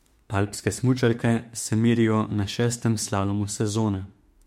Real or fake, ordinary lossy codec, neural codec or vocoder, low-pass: fake; MP3, 64 kbps; autoencoder, 48 kHz, 32 numbers a frame, DAC-VAE, trained on Japanese speech; 19.8 kHz